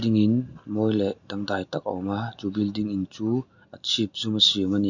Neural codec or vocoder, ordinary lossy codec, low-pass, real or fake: none; none; 7.2 kHz; real